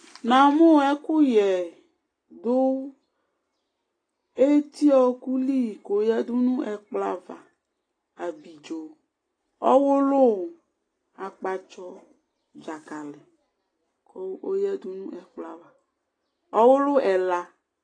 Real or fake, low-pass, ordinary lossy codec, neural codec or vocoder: real; 9.9 kHz; AAC, 32 kbps; none